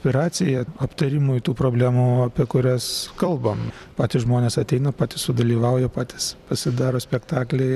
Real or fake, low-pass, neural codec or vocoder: fake; 14.4 kHz; vocoder, 44.1 kHz, 128 mel bands every 256 samples, BigVGAN v2